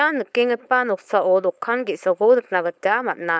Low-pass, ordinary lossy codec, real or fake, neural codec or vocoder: none; none; fake; codec, 16 kHz, 4.8 kbps, FACodec